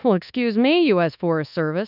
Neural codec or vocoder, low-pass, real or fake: codec, 24 kHz, 1.2 kbps, DualCodec; 5.4 kHz; fake